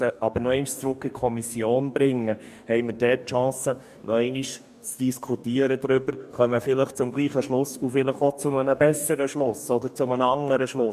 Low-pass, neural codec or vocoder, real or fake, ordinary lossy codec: 14.4 kHz; codec, 44.1 kHz, 2.6 kbps, DAC; fake; MP3, 96 kbps